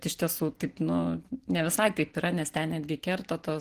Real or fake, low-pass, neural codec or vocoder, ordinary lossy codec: real; 14.4 kHz; none; Opus, 16 kbps